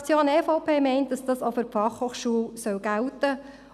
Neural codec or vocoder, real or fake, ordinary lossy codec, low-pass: none; real; none; 14.4 kHz